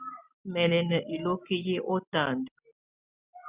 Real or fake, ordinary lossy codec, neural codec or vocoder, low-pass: real; Opus, 64 kbps; none; 3.6 kHz